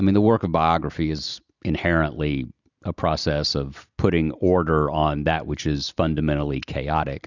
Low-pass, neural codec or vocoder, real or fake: 7.2 kHz; none; real